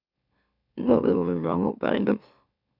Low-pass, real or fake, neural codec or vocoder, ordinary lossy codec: 5.4 kHz; fake; autoencoder, 44.1 kHz, a latent of 192 numbers a frame, MeloTTS; none